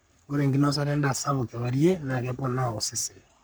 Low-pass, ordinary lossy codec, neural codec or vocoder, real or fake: none; none; codec, 44.1 kHz, 3.4 kbps, Pupu-Codec; fake